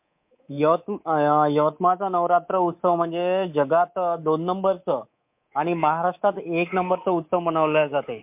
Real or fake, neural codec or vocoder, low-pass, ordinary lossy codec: fake; codec, 24 kHz, 3.1 kbps, DualCodec; 3.6 kHz; MP3, 32 kbps